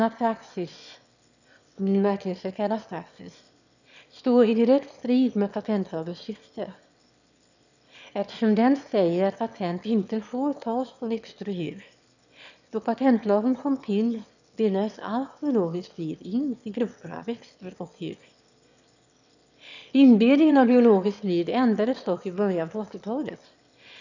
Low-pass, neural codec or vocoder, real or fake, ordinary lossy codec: 7.2 kHz; autoencoder, 22.05 kHz, a latent of 192 numbers a frame, VITS, trained on one speaker; fake; none